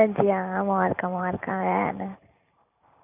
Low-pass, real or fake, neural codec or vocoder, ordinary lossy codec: 3.6 kHz; fake; vocoder, 44.1 kHz, 128 mel bands every 512 samples, BigVGAN v2; none